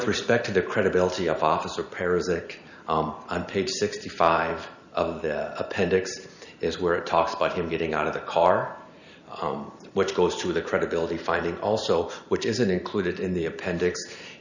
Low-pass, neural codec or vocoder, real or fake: 7.2 kHz; vocoder, 44.1 kHz, 128 mel bands every 512 samples, BigVGAN v2; fake